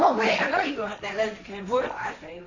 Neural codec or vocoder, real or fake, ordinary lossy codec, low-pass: codec, 16 kHz, 1.1 kbps, Voila-Tokenizer; fake; none; 7.2 kHz